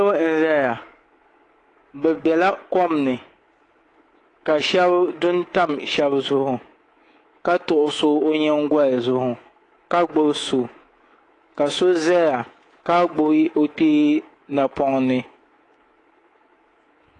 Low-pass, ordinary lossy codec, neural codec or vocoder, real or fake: 10.8 kHz; AAC, 32 kbps; codec, 24 kHz, 3.1 kbps, DualCodec; fake